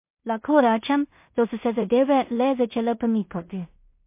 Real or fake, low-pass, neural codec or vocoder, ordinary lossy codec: fake; 3.6 kHz; codec, 16 kHz in and 24 kHz out, 0.4 kbps, LongCat-Audio-Codec, two codebook decoder; MP3, 24 kbps